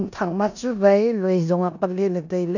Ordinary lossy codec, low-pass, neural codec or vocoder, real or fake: none; 7.2 kHz; codec, 16 kHz in and 24 kHz out, 0.9 kbps, LongCat-Audio-Codec, four codebook decoder; fake